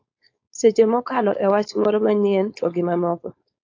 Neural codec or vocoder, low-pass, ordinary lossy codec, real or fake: codec, 16 kHz, 4.8 kbps, FACodec; 7.2 kHz; AAC, 48 kbps; fake